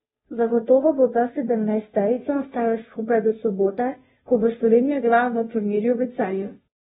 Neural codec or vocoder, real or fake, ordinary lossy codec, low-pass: codec, 16 kHz, 0.5 kbps, FunCodec, trained on Chinese and English, 25 frames a second; fake; AAC, 16 kbps; 7.2 kHz